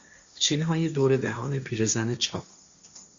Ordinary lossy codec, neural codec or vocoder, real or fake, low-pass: Opus, 64 kbps; codec, 16 kHz, 1.1 kbps, Voila-Tokenizer; fake; 7.2 kHz